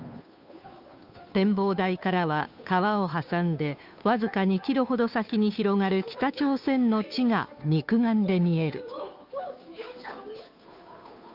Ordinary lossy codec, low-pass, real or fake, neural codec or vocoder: none; 5.4 kHz; fake; codec, 16 kHz, 2 kbps, FunCodec, trained on Chinese and English, 25 frames a second